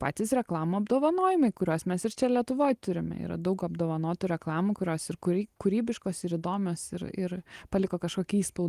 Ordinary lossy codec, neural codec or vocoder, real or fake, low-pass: Opus, 24 kbps; none; real; 14.4 kHz